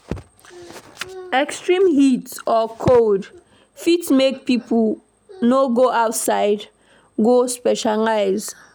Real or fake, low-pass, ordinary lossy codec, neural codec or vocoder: real; none; none; none